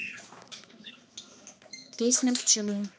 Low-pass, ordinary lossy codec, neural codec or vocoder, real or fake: none; none; codec, 16 kHz, 2 kbps, X-Codec, HuBERT features, trained on balanced general audio; fake